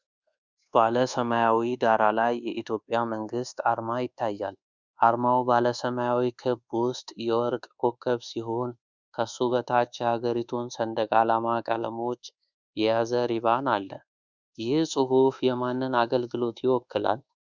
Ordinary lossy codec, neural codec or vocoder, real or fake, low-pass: Opus, 64 kbps; codec, 24 kHz, 1.2 kbps, DualCodec; fake; 7.2 kHz